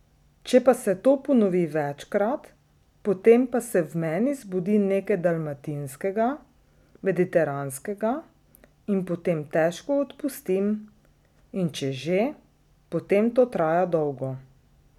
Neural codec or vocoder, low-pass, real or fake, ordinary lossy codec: none; 19.8 kHz; real; none